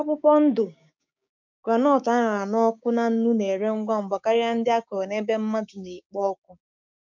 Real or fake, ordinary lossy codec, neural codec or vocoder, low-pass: fake; MP3, 64 kbps; codec, 16 kHz, 6 kbps, DAC; 7.2 kHz